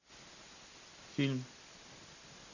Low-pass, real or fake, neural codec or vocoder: 7.2 kHz; real; none